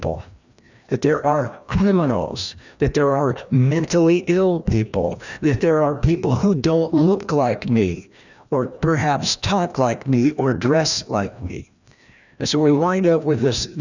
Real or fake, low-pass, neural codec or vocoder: fake; 7.2 kHz; codec, 16 kHz, 1 kbps, FreqCodec, larger model